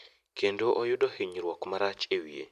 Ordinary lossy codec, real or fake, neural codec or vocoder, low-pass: none; real; none; 14.4 kHz